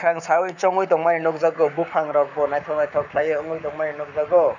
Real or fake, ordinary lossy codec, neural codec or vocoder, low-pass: fake; none; codec, 44.1 kHz, 7.8 kbps, Pupu-Codec; 7.2 kHz